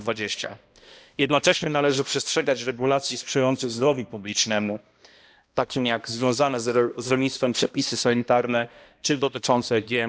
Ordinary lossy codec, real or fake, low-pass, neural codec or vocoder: none; fake; none; codec, 16 kHz, 1 kbps, X-Codec, HuBERT features, trained on balanced general audio